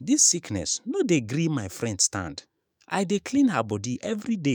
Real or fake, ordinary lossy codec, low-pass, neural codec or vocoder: fake; none; none; autoencoder, 48 kHz, 128 numbers a frame, DAC-VAE, trained on Japanese speech